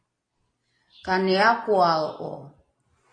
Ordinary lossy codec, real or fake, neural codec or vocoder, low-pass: AAC, 32 kbps; real; none; 9.9 kHz